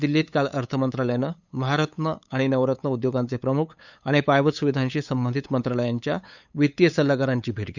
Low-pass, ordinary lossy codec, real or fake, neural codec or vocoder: 7.2 kHz; none; fake; codec, 16 kHz, 8 kbps, FunCodec, trained on LibriTTS, 25 frames a second